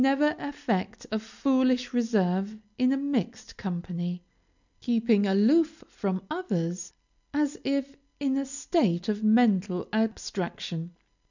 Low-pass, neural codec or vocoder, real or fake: 7.2 kHz; none; real